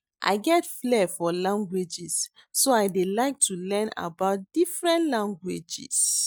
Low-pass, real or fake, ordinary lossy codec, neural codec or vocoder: none; real; none; none